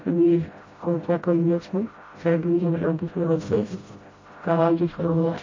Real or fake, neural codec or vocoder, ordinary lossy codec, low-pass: fake; codec, 16 kHz, 0.5 kbps, FreqCodec, smaller model; MP3, 32 kbps; 7.2 kHz